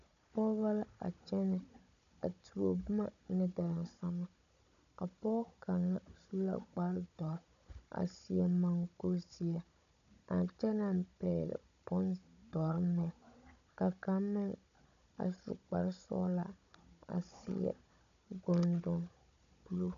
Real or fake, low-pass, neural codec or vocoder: fake; 7.2 kHz; codec, 16 kHz, 16 kbps, FunCodec, trained on Chinese and English, 50 frames a second